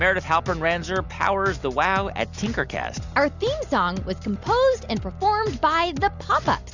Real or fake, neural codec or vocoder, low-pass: real; none; 7.2 kHz